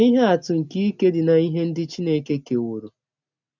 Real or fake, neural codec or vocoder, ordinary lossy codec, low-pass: real; none; none; 7.2 kHz